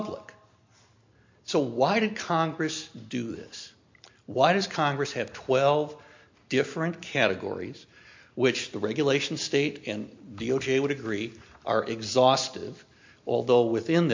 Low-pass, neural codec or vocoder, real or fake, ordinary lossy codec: 7.2 kHz; none; real; MP3, 48 kbps